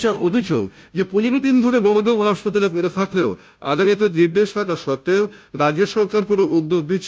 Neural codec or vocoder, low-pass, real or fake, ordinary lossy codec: codec, 16 kHz, 0.5 kbps, FunCodec, trained on Chinese and English, 25 frames a second; none; fake; none